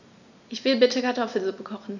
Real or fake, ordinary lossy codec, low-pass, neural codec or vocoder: real; none; 7.2 kHz; none